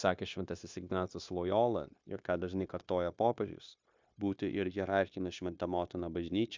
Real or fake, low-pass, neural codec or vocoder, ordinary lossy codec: fake; 7.2 kHz; codec, 16 kHz, 0.9 kbps, LongCat-Audio-Codec; MP3, 64 kbps